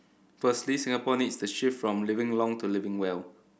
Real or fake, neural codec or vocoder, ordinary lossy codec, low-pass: real; none; none; none